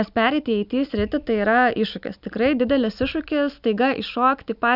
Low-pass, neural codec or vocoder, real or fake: 5.4 kHz; none; real